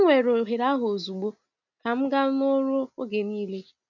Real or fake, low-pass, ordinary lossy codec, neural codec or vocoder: real; 7.2 kHz; none; none